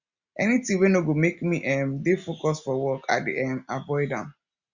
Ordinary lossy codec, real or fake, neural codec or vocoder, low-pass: Opus, 64 kbps; real; none; 7.2 kHz